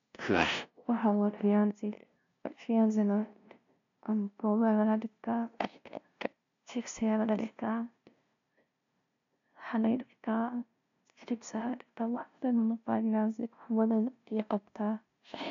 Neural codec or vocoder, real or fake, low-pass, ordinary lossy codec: codec, 16 kHz, 0.5 kbps, FunCodec, trained on LibriTTS, 25 frames a second; fake; 7.2 kHz; MP3, 64 kbps